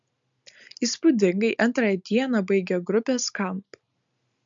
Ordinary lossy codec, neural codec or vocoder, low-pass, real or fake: MP3, 64 kbps; none; 7.2 kHz; real